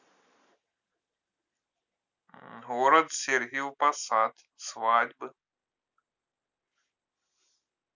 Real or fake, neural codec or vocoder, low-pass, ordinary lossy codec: real; none; 7.2 kHz; none